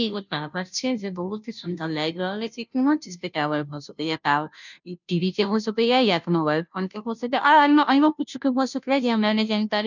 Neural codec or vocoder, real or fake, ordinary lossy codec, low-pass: codec, 16 kHz, 0.5 kbps, FunCodec, trained on Chinese and English, 25 frames a second; fake; none; 7.2 kHz